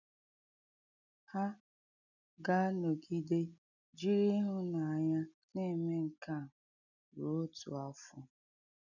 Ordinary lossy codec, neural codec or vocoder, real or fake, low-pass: none; none; real; 7.2 kHz